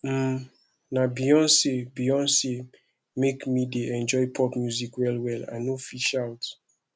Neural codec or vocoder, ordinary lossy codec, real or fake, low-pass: none; none; real; none